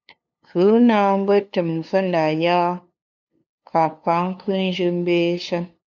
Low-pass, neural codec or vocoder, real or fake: 7.2 kHz; codec, 16 kHz, 2 kbps, FunCodec, trained on LibriTTS, 25 frames a second; fake